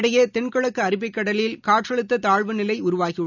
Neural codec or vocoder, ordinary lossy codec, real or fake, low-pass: none; none; real; 7.2 kHz